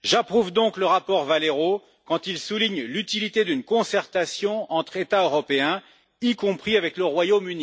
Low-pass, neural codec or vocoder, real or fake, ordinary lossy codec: none; none; real; none